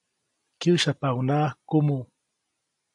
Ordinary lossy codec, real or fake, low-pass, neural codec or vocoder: AAC, 64 kbps; real; 10.8 kHz; none